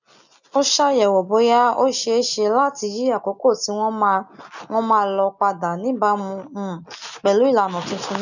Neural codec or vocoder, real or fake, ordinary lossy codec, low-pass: none; real; none; 7.2 kHz